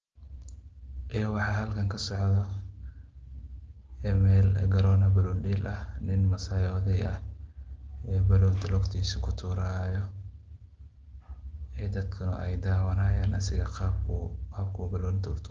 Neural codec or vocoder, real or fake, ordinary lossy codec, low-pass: none; real; Opus, 16 kbps; 7.2 kHz